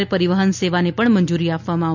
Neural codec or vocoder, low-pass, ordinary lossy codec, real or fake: none; 7.2 kHz; none; real